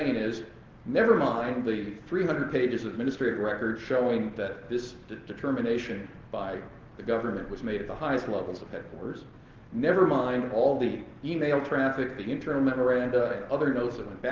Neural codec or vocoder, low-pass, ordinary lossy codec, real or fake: none; 7.2 kHz; Opus, 16 kbps; real